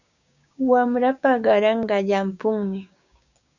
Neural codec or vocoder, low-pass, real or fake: codec, 16 kHz, 6 kbps, DAC; 7.2 kHz; fake